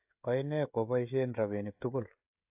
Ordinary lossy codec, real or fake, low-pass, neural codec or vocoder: AAC, 32 kbps; fake; 3.6 kHz; codec, 16 kHz, 16 kbps, FreqCodec, smaller model